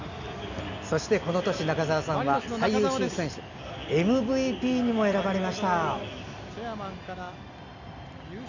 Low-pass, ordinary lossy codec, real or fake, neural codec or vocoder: 7.2 kHz; none; real; none